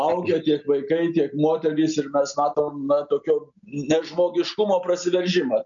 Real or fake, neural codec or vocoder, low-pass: real; none; 7.2 kHz